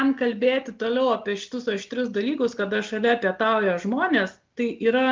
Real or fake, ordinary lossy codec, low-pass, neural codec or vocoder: real; Opus, 32 kbps; 7.2 kHz; none